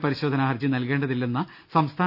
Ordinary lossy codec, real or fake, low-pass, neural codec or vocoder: none; real; 5.4 kHz; none